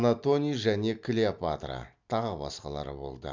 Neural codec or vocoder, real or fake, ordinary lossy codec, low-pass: none; real; MP3, 64 kbps; 7.2 kHz